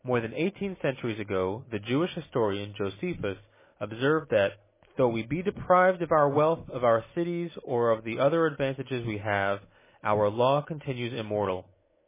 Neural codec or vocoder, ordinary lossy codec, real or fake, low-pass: none; MP3, 16 kbps; real; 3.6 kHz